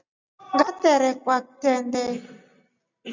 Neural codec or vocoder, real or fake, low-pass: none; real; 7.2 kHz